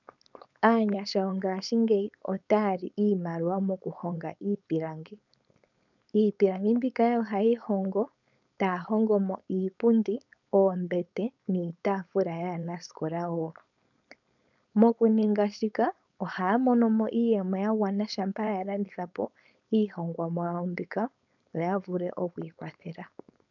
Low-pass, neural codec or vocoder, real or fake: 7.2 kHz; codec, 16 kHz, 4.8 kbps, FACodec; fake